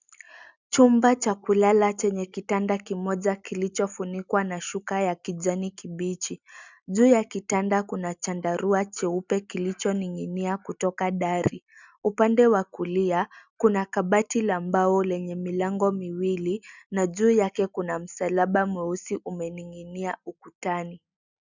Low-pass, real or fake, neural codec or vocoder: 7.2 kHz; real; none